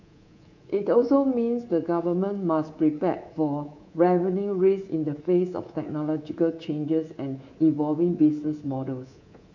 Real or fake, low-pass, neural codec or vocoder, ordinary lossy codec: fake; 7.2 kHz; codec, 24 kHz, 3.1 kbps, DualCodec; none